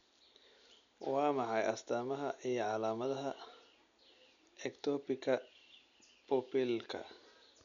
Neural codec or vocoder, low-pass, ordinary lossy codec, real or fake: none; 7.2 kHz; none; real